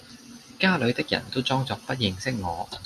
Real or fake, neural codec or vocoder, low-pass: real; none; 14.4 kHz